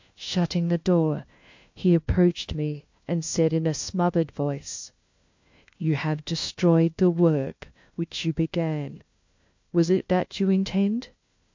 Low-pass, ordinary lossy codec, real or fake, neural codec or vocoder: 7.2 kHz; MP3, 48 kbps; fake; codec, 16 kHz, 1 kbps, FunCodec, trained on LibriTTS, 50 frames a second